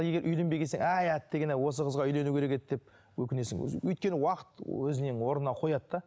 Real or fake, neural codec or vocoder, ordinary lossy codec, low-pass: real; none; none; none